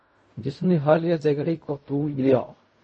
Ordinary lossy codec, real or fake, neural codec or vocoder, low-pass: MP3, 32 kbps; fake; codec, 16 kHz in and 24 kHz out, 0.4 kbps, LongCat-Audio-Codec, fine tuned four codebook decoder; 10.8 kHz